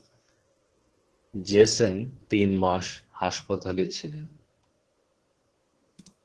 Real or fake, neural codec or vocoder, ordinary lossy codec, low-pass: fake; codec, 24 kHz, 1 kbps, SNAC; Opus, 16 kbps; 10.8 kHz